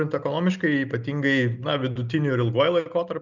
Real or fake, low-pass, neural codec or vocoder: real; 7.2 kHz; none